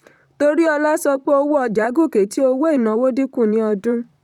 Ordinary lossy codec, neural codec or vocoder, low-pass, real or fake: none; vocoder, 44.1 kHz, 128 mel bands, Pupu-Vocoder; 19.8 kHz; fake